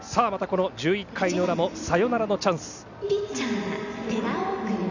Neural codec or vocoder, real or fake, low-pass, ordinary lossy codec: none; real; 7.2 kHz; none